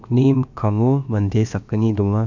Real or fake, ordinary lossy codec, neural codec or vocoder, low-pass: fake; none; codec, 16 kHz, about 1 kbps, DyCAST, with the encoder's durations; 7.2 kHz